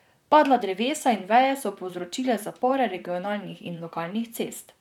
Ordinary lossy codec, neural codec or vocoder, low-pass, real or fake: none; vocoder, 44.1 kHz, 128 mel bands, Pupu-Vocoder; 19.8 kHz; fake